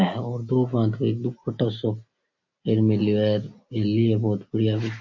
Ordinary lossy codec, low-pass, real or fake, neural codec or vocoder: MP3, 32 kbps; 7.2 kHz; real; none